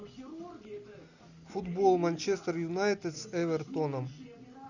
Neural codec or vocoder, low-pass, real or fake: none; 7.2 kHz; real